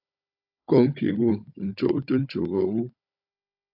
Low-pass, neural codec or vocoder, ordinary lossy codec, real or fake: 5.4 kHz; codec, 16 kHz, 16 kbps, FunCodec, trained on Chinese and English, 50 frames a second; AAC, 48 kbps; fake